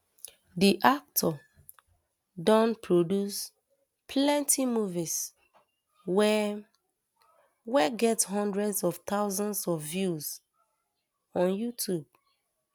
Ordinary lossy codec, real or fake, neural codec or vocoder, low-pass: none; real; none; none